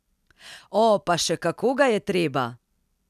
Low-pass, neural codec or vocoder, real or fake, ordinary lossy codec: 14.4 kHz; none; real; none